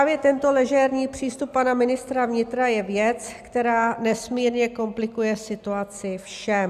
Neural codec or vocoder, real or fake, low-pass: none; real; 14.4 kHz